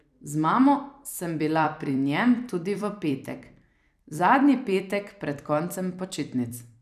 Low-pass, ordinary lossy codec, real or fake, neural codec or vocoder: 14.4 kHz; none; fake; vocoder, 44.1 kHz, 128 mel bands every 256 samples, BigVGAN v2